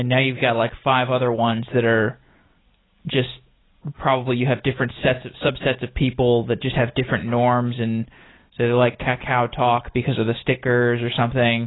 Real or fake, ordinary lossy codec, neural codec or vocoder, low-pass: real; AAC, 16 kbps; none; 7.2 kHz